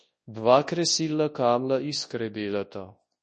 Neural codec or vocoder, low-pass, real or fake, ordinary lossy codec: codec, 24 kHz, 0.9 kbps, WavTokenizer, large speech release; 10.8 kHz; fake; MP3, 32 kbps